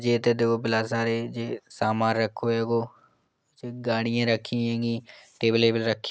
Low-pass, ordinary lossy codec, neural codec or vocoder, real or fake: none; none; none; real